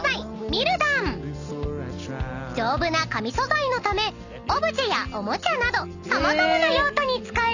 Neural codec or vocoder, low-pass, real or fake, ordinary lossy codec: none; 7.2 kHz; real; none